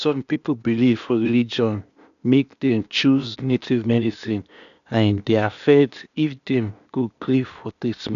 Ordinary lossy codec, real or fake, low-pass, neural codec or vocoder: none; fake; 7.2 kHz; codec, 16 kHz, 0.8 kbps, ZipCodec